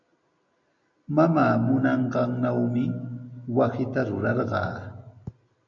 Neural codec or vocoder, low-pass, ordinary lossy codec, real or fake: none; 7.2 kHz; MP3, 48 kbps; real